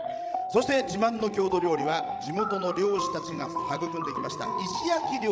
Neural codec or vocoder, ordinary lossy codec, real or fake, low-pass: codec, 16 kHz, 16 kbps, FreqCodec, larger model; none; fake; none